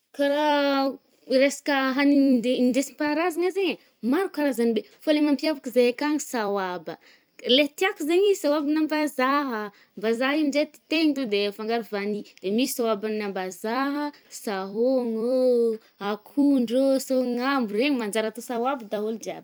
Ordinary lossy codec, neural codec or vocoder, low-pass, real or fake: none; vocoder, 44.1 kHz, 128 mel bands every 256 samples, BigVGAN v2; none; fake